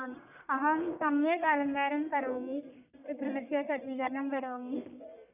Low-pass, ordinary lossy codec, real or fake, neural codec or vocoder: 3.6 kHz; none; fake; codec, 44.1 kHz, 1.7 kbps, Pupu-Codec